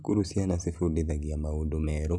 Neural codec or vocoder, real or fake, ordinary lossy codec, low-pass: none; real; none; none